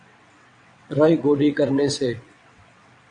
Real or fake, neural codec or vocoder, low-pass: fake; vocoder, 22.05 kHz, 80 mel bands, WaveNeXt; 9.9 kHz